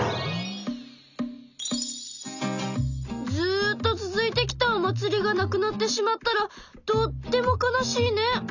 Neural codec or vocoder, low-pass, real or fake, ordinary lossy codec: none; 7.2 kHz; real; none